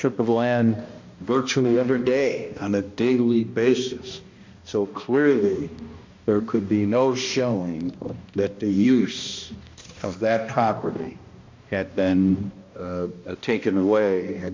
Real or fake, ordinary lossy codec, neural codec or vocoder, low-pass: fake; MP3, 48 kbps; codec, 16 kHz, 1 kbps, X-Codec, HuBERT features, trained on balanced general audio; 7.2 kHz